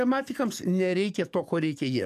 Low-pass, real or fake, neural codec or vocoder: 14.4 kHz; fake; codec, 44.1 kHz, 7.8 kbps, DAC